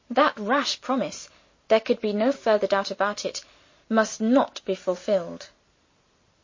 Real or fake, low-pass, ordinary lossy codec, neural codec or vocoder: real; 7.2 kHz; MP3, 32 kbps; none